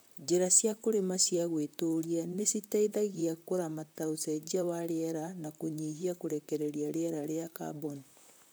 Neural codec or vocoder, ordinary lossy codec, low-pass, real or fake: vocoder, 44.1 kHz, 128 mel bands, Pupu-Vocoder; none; none; fake